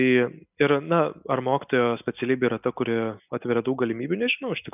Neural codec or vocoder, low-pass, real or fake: none; 3.6 kHz; real